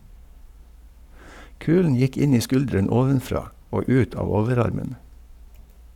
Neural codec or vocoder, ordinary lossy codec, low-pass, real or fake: codec, 44.1 kHz, 7.8 kbps, Pupu-Codec; none; 19.8 kHz; fake